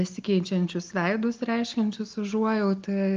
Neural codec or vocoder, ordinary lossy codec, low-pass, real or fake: none; Opus, 32 kbps; 7.2 kHz; real